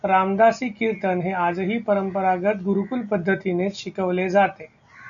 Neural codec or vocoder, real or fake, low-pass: none; real; 7.2 kHz